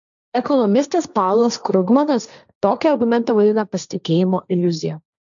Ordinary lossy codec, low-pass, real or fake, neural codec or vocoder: MP3, 96 kbps; 7.2 kHz; fake; codec, 16 kHz, 1.1 kbps, Voila-Tokenizer